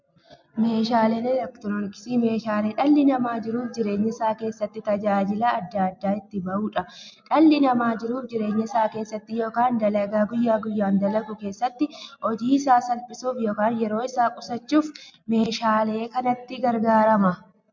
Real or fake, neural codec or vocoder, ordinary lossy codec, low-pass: real; none; MP3, 64 kbps; 7.2 kHz